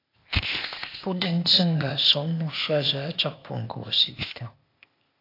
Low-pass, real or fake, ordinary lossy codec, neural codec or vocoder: 5.4 kHz; fake; AAC, 32 kbps; codec, 16 kHz, 0.8 kbps, ZipCodec